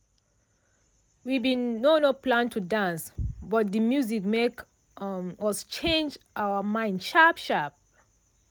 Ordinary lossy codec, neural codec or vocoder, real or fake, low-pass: none; none; real; none